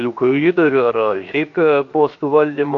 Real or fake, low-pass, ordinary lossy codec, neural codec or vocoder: fake; 7.2 kHz; AAC, 48 kbps; codec, 16 kHz, 0.7 kbps, FocalCodec